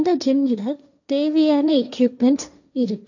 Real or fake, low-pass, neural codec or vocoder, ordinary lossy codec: fake; 7.2 kHz; codec, 16 kHz, 1.1 kbps, Voila-Tokenizer; none